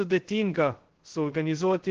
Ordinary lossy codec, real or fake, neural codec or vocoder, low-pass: Opus, 16 kbps; fake; codec, 16 kHz, 0.2 kbps, FocalCodec; 7.2 kHz